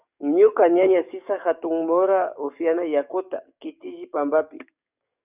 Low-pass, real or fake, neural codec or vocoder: 3.6 kHz; fake; codec, 44.1 kHz, 7.8 kbps, DAC